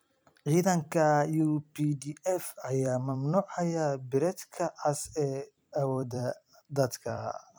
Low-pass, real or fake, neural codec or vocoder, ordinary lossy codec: none; fake; vocoder, 44.1 kHz, 128 mel bands every 256 samples, BigVGAN v2; none